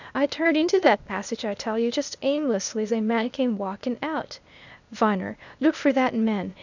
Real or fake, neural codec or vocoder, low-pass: fake; codec, 16 kHz, 0.8 kbps, ZipCodec; 7.2 kHz